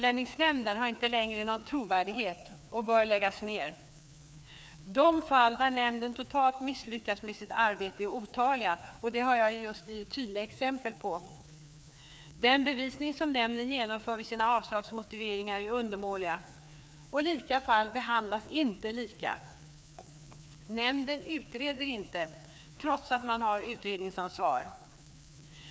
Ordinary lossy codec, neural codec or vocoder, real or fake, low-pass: none; codec, 16 kHz, 2 kbps, FreqCodec, larger model; fake; none